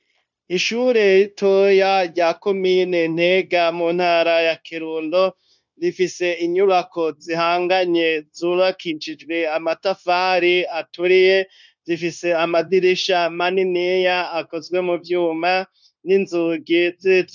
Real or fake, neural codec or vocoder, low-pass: fake; codec, 16 kHz, 0.9 kbps, LongCat-Audio-Codec; 7.2 kHz